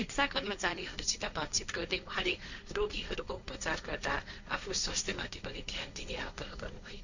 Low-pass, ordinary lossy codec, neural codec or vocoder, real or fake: 7.2 kHz; none; codec, 16 kHz, 1.1 kbps, Voila-Tokenizer; fake